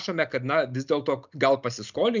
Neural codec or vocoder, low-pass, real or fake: none; 7.2 kHz; real